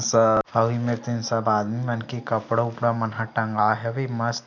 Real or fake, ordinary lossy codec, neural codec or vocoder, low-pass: real; none; none; 7.2 kHz